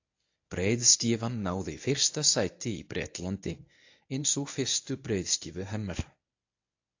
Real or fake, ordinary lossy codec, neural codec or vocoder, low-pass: fake; AAC, 48 kbps; codec, 24 kHz, 0.9 kbps, WavTokenizer, medium speech release version 1; 7.2 kHz